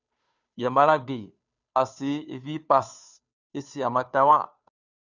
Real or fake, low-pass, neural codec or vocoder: fake; 7.2 kHz; codec, 16 kHz, 2 kbps, FunCodec, trained on Chinese and English, 25 frames a second